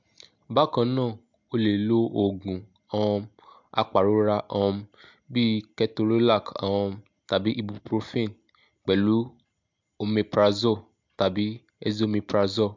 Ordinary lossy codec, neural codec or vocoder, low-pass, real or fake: MP3, 64 kbps; none; 7.2 kHz; real